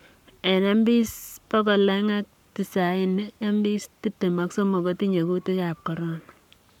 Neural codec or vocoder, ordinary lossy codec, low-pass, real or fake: codec, 44.1 kHz, 7.8 kbps, Pupu-Codec; none; 19.8 kHz; fake